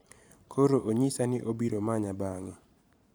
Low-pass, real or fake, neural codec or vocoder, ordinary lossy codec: none; fake; vocoder, 44.1 kHz, 128 mel bands every 512 samples, BigVGAN v2; none